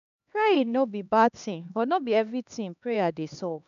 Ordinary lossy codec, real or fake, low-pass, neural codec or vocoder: none; fake; 7.2 kHz; codec, 16 kHz, 2 kbps, X-Codec, HuBERT features, trained on LibriSpeech